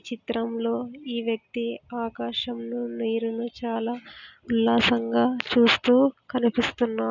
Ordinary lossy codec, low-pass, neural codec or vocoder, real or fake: none; 7.2 kHz; none; real